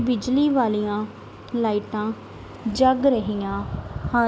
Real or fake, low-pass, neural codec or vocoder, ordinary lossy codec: real; none; none; none